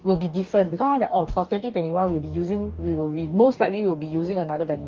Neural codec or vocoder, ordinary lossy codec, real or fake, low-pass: codec, 44.1 kHz, 2.6 kbps, DAC; Opus, 32 kbps; fake; 7.2 kHz